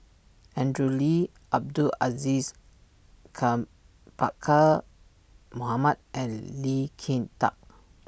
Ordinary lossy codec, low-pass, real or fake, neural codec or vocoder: none; none; real; none